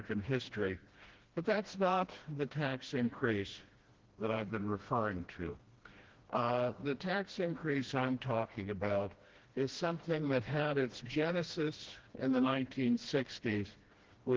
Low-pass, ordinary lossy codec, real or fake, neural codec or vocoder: 7.2 kHz; Opus, 16 kbps; fake; codec, 16 kHz, 1 kbps, FreqCodec, smaller model